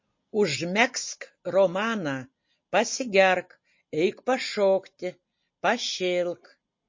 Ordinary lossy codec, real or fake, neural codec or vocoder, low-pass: MP3, 32 kbps; real; none; 7.2 kHz